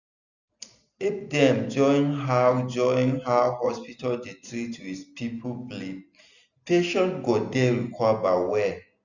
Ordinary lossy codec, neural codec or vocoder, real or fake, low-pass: none; none; real; 7.2 kHz